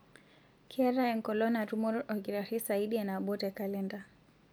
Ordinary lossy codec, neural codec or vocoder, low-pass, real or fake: none; none; none; real